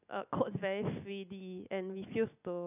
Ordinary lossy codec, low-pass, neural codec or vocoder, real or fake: none; 3.6 kHz; none; real